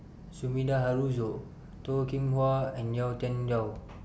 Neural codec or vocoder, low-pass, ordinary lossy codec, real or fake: none; none; none; real